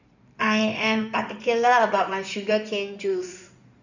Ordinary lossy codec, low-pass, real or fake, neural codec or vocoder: none; 7.2 kHz; fake; codec, 16 kHz in and 24 kHz out, 2.2 kbps, FireRedTTS-2 codec